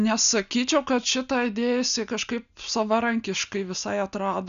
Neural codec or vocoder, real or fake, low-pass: none; real; 7.2 kHz